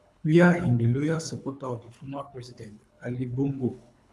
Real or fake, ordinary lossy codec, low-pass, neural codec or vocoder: fake; none; none; codec, 24 kHz, 3 kbps, HILCodec